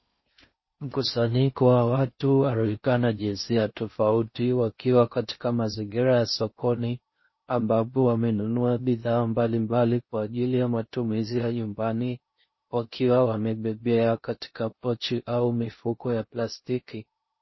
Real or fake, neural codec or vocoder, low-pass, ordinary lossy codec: fake; codec, 16 kHz in and 24 kHz out, 0.6 kbps, FocalCodec, streaming, 4096 codes; 7.2 kHz; MP3, 24 kbps